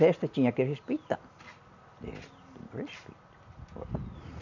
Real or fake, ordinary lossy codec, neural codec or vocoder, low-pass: real; none; none; 7.2 kHz